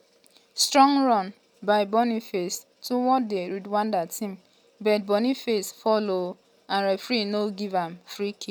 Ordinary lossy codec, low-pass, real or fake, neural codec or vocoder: none; none; real; none